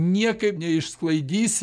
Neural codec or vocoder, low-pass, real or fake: none; 9.9 kHz; real